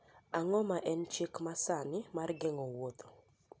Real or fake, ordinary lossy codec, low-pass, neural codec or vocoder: real; none; none; none